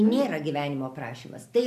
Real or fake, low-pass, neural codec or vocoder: real; 14.4 kHz; none